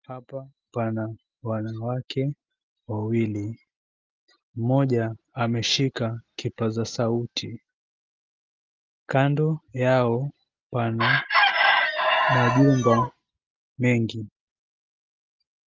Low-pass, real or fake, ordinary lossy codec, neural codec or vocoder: 7.2 kHz; real; Opus, 32 kbps; none